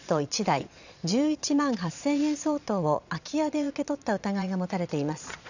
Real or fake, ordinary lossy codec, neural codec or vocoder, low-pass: fake; none; vocoder, 22.05 kHz, 80 mel bands, Vocos; 7.2 kHz